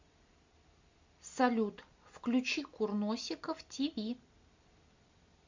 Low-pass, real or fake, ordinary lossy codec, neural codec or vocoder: 7.2 kHz; real; MP3, 64 kbps; none